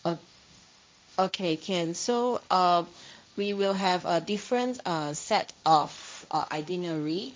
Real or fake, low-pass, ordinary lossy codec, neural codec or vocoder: fake; none; none; codec, 16 kHz, 1.1 kbps, Voila-Tokenizer